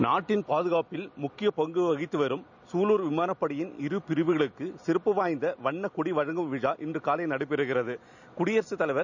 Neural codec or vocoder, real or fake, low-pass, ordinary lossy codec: none; real; 7.2 kHz; none